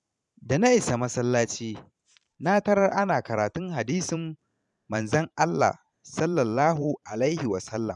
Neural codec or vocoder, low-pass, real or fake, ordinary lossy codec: vocoder, 44.1 kHz, 128 mel bands every 512 samples, BigVGAN v2; 10.8 kHz; fake; none